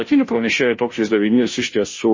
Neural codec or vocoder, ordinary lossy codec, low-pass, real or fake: codec, 16 kHz, 0.5 kbps, FunCodec, trained on Chinese and English, 25 frames a second; MP3, 32 kbps; 7.2 kHz; fake